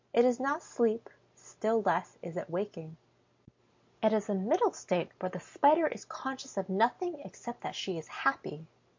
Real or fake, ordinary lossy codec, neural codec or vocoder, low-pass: real; MP3, 48 kbps; none; 7.2 kHz